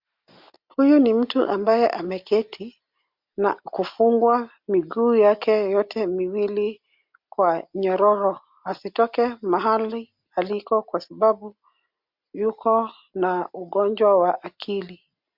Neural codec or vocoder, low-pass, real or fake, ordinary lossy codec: none; 5.4 kHz; real; MP3, 48 kbps